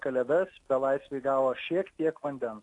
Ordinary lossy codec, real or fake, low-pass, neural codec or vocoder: AAC, 48 kbps; real; 10.8 kHz; none